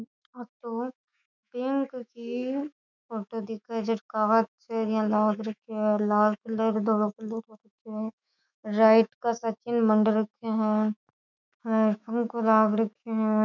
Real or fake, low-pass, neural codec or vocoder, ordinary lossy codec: fake; 7.2 kHz; autoencoder, 48 kHz, 128 numbers a frame, DAC-VAE, trained on Japanese speech; none